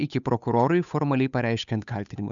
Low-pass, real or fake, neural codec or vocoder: 7.2 kHz; fake; codec, 16 kHz, 8 kbps, FunCodec, trained on Chinese and English, 25 frames a second